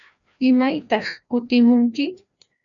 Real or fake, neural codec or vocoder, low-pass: fake; codec, 16 kHz, 1 kbps, FreqCodec, larger model; 7.2 kHz